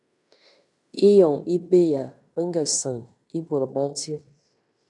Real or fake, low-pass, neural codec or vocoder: fake; 10.8 kHz; codec, 16 kHz in and 24 kHz out, 0.9 kbps, LongCat-Audio-Codec, fine tuned four codebook decoder